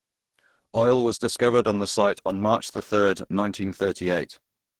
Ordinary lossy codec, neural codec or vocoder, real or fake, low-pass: Opus, 16 kbps; codec, 44.1 kHz, 2.6 kbps, DAC; fake; 19.8 kHz